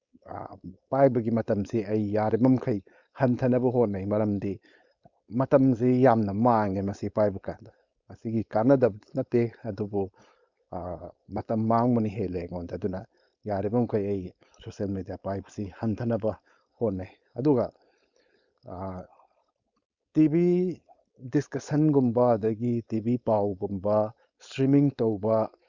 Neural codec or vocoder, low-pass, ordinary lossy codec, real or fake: codec, 16 kHz, 4.8 kbps, FACodec; 7.2 kHz; Opus, 64 kbps; fake